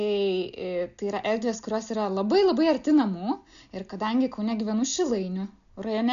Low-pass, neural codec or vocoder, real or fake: 7.2 kHz; none; real